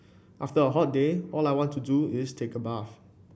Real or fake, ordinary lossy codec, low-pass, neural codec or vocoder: real; none; none; none